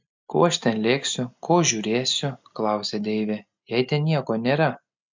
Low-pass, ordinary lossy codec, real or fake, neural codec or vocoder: 7.2 kHz; MP3, 64 kbps; real; none